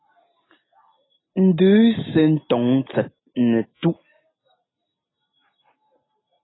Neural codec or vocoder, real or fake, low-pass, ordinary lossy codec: none; real; 7.2 kHz; AAC, 16 kbps